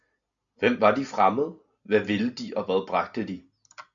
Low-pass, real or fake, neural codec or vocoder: 7.2 kHz; real; none